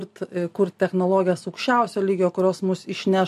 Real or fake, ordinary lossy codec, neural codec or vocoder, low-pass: real; MP3, 64 kbps; none; 14.4 kHz